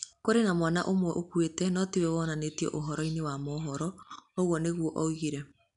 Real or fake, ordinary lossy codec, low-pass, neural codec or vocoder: real; none; 10.8 kHz; none